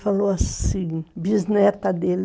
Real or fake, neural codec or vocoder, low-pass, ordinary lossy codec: real; none; none; none